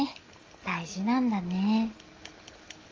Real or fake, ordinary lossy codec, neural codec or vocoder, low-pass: real; Opus, 32 kbps; none; 7.2 kHz